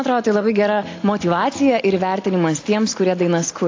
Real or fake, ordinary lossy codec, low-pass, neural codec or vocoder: real; AAC, 32 kbps; 7.2 kHz; none